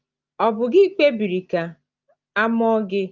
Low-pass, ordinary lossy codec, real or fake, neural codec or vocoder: 7.2 kHz; Opus, 24 kbps; real; none